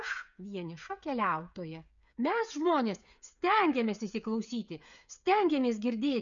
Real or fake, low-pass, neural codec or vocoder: fake; 7.2 kHz; codec, 16 kHz, 8 kbps, FreqCodec, smaller model